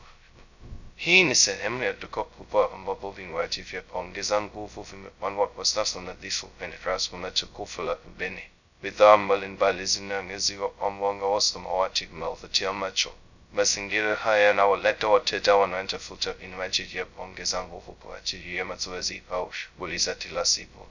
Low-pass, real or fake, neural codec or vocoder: 7.2 kHz; fake; codec, 16 kHz, 0.2 kbps, FocalCodec